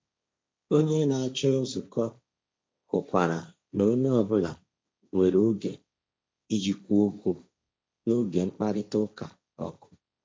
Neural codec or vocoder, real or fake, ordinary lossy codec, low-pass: codec, 16 kHz, 1.1 kbps, Voila-Tokenizer; fake; none; none